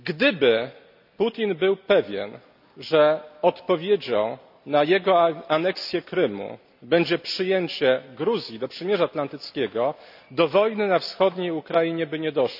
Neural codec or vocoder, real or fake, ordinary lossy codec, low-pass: none; real; none; 5.4 kHz